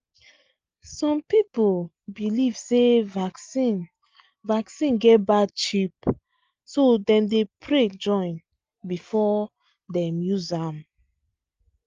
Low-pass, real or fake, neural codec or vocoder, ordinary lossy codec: 7.2 kHz; real; none; Opus, 32 kbps